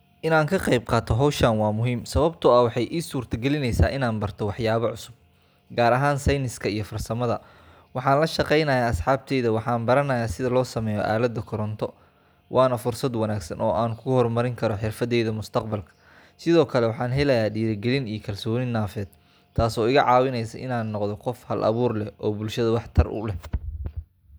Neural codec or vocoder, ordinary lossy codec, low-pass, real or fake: none; none; none; real